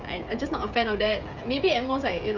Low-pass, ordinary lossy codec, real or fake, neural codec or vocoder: 7.2 kHz; none; fake; codec, 16 kHz, 16 kbps, FreqCodec, smaller model